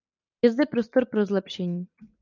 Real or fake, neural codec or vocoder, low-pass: fake; vocoder, 44.1 kHz, 128 mel bands every 256 samples, BigVGAN v2; 7.2 kHz